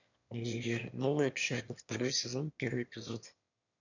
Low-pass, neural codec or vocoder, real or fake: 7.2 kHz; autoencoder, 22.05 kHz, a latent of 192 numbers a frame, VITS, trained on one speaker; fake